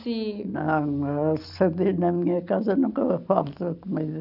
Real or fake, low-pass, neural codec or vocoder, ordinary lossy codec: real; 5.4 kHz; none; none